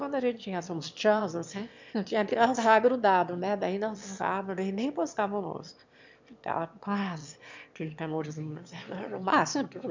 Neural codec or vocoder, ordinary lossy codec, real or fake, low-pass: autoencoder, 22.05 kHz, a latent of 192 numbers a frame, VITS, trained on one speaker; MP3, 64 kbps; fake; 7.2 kHz